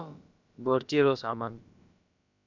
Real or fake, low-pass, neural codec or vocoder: fake; 7.2 kHz; codec, 16 kHz, about 1 kbps, DyCAST, with the encoder's durations